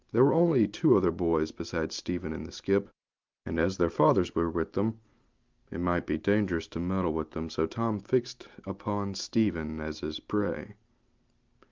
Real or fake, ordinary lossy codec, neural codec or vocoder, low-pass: real; Opus, 24 kbps; none; 7.2 kHz